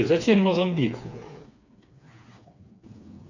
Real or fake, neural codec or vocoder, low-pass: fake; codec, 16 kHz, 4 kbps, FreqCodec, smaller model; 7.2 kHz